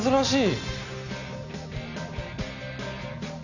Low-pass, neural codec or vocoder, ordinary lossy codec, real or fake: 7.2 kHz; none; none; real